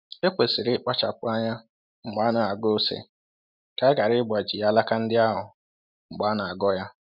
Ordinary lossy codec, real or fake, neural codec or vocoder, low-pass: none; real; none; 5.4 kHz